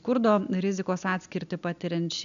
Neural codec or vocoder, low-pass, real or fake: none; 7.2 kHz; real